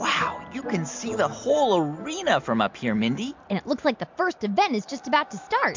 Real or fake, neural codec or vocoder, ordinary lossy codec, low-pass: real; none; MP3, 64 kbps; 7.2 kHz